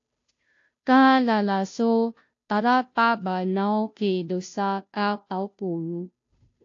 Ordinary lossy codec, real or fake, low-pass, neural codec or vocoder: AAC, 48 kbps; fake; 7.2 kHz; codec, 16 kHz, 0.5 kbps, FunCodec, trained on Chinese and English, 25 frames a second